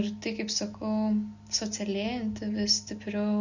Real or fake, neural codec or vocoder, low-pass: real; none; 7.2 kHz